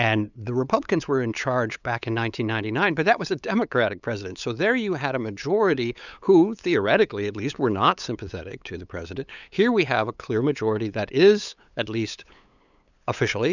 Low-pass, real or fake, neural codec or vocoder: 7.2 kHz; fake; codec, 16 kHz, 8 kbps, FunCodec, trained on LibriTTS, 25 frames a second